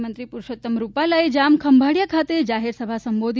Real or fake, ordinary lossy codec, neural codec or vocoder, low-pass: real; none; none; none